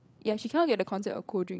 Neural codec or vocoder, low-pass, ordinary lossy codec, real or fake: codec, 16 kHz, 8 kbps, FreqCodec, larger model; none; none; fake